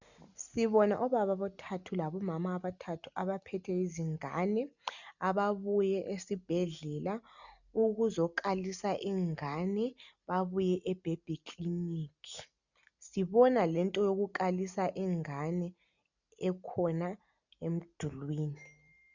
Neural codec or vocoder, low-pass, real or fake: none; 7.2 kHz; real